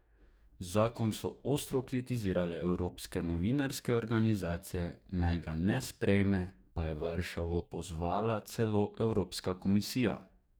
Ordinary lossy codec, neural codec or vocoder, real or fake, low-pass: none; codec, 44.1 kHz, 2.6 kbps, DAC; fake; none